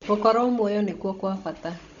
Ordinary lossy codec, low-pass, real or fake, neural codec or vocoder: none; 7.2 kHz; fake; codec, 16 kHz, 16 kbps, FunCodec, trained on Chinese and English, 50 frames a second